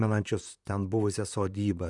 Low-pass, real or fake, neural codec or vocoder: 10.8 kHz; fake; vocoder, 44.1 kHz, 128 mel bands, Pupu-Vocoder